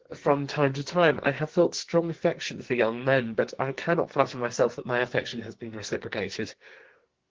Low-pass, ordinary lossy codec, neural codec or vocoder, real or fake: 7.2 kHz; Opus, 16 kbps; codec, 44.1 kHz, 2.6 kbps, SNAC; fake